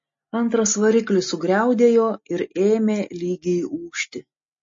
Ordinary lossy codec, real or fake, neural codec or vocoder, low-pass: MP3, 32 kbps; real; none; 7.2 kHz